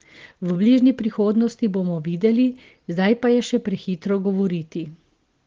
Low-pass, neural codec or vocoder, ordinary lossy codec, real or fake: 7.2 kHz; none; Opus, 16 kbps; real